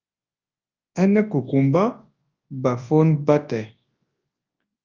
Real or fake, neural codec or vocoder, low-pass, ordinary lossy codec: fake; codec, 24 kHz, 0.9 kbps, WavTokenizer, large speech release; 7.2 kHz; Opus, 24 kbps